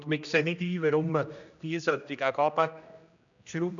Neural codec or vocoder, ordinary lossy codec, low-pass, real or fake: codec, 16 kHz, 1 kbps, X-Codec, HuBERT features, trained on general audio; none; 7.2 kHz; fake